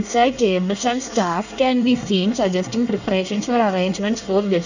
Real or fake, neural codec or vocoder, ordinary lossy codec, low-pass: fake; codec, 24 kHz, 1 kbps, SNAC; none; 7.2 kHz